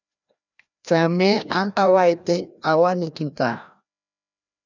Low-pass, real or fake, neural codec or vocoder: 7.2 kHz; fake; codec, 16 kHz, 1 kbps, FreqCodec, larger model